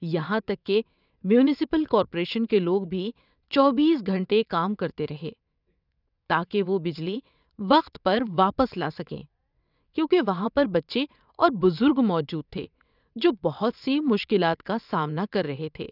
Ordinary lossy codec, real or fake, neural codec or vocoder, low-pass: none; fake; vocoder, 22.05 kHz, 80 mel bands, WaveNeXt; 5.4 kHz